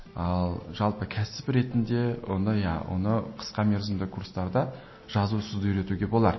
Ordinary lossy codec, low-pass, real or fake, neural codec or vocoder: MP3, 24 kbps; 7.2 kHz; real; none